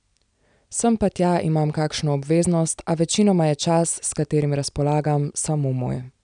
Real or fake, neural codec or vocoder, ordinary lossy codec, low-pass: real; none; none; 9.9 kHz